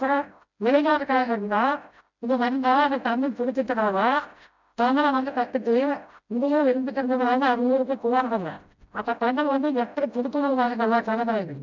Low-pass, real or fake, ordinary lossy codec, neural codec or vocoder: 7.2 kHz; fake; MP3, 64 kbps; codec, 16 kHz, 0.5 kbps, FreqCodec, smaller model